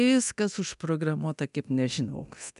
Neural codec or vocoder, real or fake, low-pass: codec, 24 kHz, 0.9 kbps, DualCodec; fake; 10.8 kHz